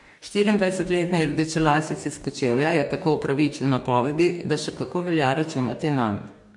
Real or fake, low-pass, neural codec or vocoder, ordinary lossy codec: fake; 10.8 kHz; codec, 44.1 kHz, 2.6 kbps, DAC; MP3, 64 kbps